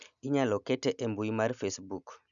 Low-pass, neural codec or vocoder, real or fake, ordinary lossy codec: 7.2 kHz; none; real; none